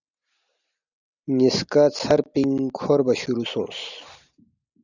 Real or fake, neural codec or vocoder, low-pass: real; none; 7.2 kHz